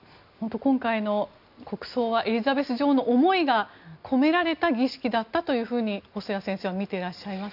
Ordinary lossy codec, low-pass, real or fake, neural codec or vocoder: none; 5.4 kHz; real; none